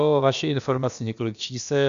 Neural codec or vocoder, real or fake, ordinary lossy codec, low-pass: codec, 16 kHz, about 1 kbps, DyCAST, with the encoder's durations; fake; AAC, 96 kbps; 7.2 kHz